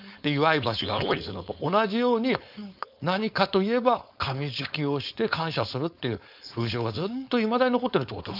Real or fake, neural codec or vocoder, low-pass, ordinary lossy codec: fake; codec, 16 kHz, 4.8 kbps, FACodec; 5.4 kHz; none